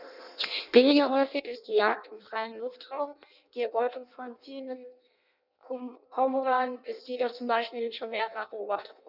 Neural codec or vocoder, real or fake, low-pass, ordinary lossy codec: codec, 16 kHz in and 24 kHz out, 0.6 kbps, FireRedTTS-2 codec; fake; 5.4 kHz; none